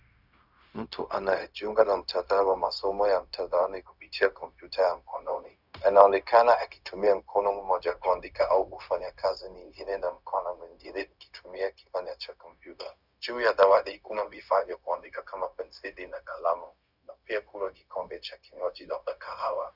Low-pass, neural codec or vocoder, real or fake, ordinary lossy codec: 5.4 kHz; codec, 16 kHz, 0.4 kbps, LongCat-Audio-Codec; fake; Opus, 64 kbps